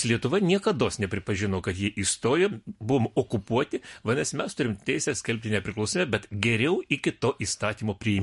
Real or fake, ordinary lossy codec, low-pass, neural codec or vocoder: real; MP3, 48 kbps; 14.4 kHz; none